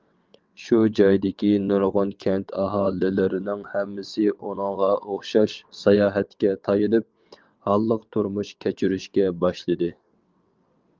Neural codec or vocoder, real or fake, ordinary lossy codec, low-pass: vocoder, 22.05 kHz, 80 mel bands, WaveNeXt; fake; Opus, 24 kbps; 7.2 kHz